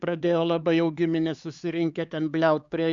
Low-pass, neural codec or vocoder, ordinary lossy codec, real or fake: 7.2 kHz; codec, 16 kHz, 4 kbps, X-Codec, HuBERT features, trained on LibriSpeech; Opus, 64 kbps; fake